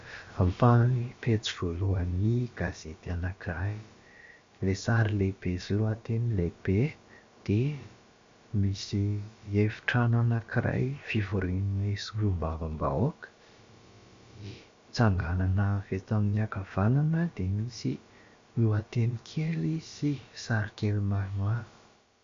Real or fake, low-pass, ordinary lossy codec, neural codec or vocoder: fake; 7.2 kHz; MP3, 48 kbps; codec, 16 kHz, about 1 kbps, DyCAST, with the encoder's durations